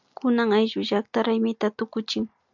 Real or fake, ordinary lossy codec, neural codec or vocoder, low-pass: real; MP3, 64 kbps; none; 7.2 kHz